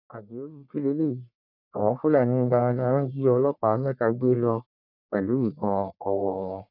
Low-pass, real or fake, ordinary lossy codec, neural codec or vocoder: 5.4 kHz; fake; none; codec, 44.1 kHz, 1.7 kbps, Pupu-Codec